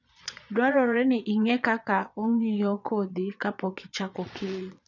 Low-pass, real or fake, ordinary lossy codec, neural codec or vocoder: 7.2 kHz; fake; none; vocoder, 22.05 kHz, 80 mel bands, Vocos